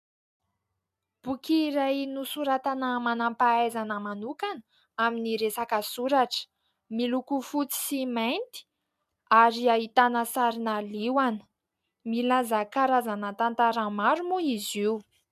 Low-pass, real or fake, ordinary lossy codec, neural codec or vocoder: 14.4 kHz; real; MP3, 96 kbps; none